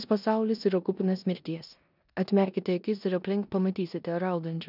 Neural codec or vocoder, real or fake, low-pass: codec, 16 kHz in and 24 kHz out, 0.9 kbps, LongCat-Audio-Codec, four codebook decoder; fake; 5.4 kHz